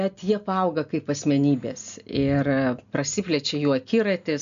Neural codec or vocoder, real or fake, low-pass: none; real; 7.2 kHz